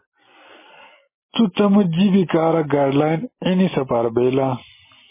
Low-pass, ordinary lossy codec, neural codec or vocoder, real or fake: 3.6 kHz; MP3, 16 kbps; none; real